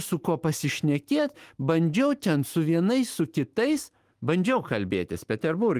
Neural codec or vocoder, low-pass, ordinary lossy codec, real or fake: autoencoder, 48 kHz, 128 numbers a frame, DAC-VAE, trained on Japanese speech; 14.4 kHz; Opus, 24 kbps; fake